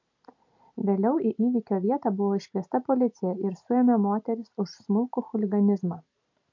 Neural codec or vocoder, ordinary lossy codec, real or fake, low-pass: none; MP3, 48 kbps; real; 7.2 kHz